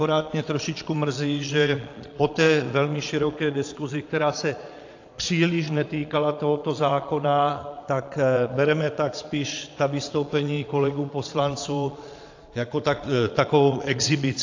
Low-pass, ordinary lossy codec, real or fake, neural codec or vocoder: 7.2 kHz; AAC, 48 kbps; fake; vocoder, 22.05 kHz, 80 mel bands, Vocos